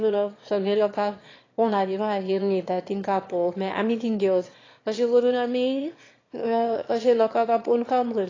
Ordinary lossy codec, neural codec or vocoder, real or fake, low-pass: AAC, 32 kbps; autoencoder, 22.05 kHz, a latent of 192 numbers a frame, VITS, trained on one speaker; fake; 7.2 kHz